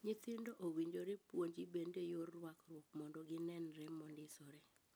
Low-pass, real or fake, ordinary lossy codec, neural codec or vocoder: none; real; none; none